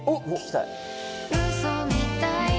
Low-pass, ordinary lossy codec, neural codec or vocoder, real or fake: none; none; none; real